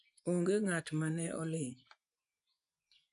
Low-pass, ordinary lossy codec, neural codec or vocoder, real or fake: 10.8 kHz; none; vocoder, 24 kHz, 100 mel bands, Vocos; fake